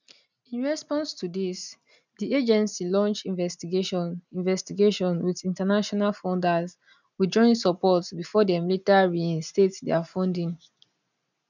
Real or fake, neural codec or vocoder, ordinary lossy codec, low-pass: real; none; none; 7.2 kHz